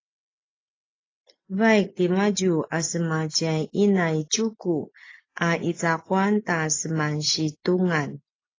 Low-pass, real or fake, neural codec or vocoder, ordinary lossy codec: 7.2 kHz; real; none; AAC, 32 kbps